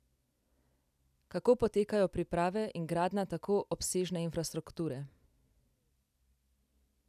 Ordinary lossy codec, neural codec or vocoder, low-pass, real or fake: none; none; 14.4 kHz; real